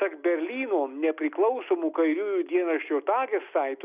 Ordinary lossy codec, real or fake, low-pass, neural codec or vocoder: Opus, 64 kbps; real; 3.6 kHz; none